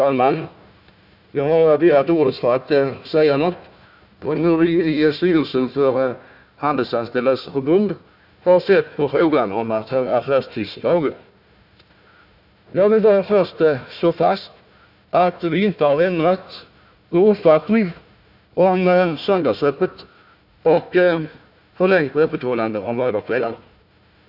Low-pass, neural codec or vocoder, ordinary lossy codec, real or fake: 5.4 kHz; codec, 16 kHz, 1 kbps, FunCodec, trained on Chinese and English, 50 frames a second; none; fake